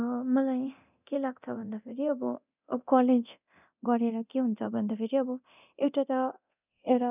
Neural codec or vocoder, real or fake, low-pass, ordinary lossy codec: codec, 24 kHz, 0.9 kbps, DualCodec; fake; 3.6 kHz; none